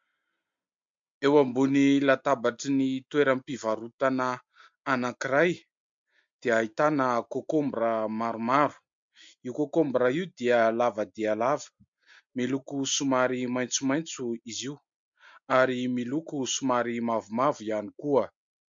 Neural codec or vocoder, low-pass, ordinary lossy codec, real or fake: none; 7.2 kHz; MP3, 48 kbps; real